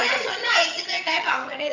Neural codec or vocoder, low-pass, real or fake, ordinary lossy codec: vocoder, 22.05 kHz, 80 mel bands, HiFi-GAN; 7.2 kHz; fake; none